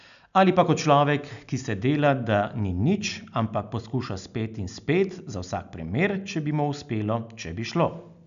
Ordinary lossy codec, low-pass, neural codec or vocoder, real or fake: none; 7.2 kHz; none; real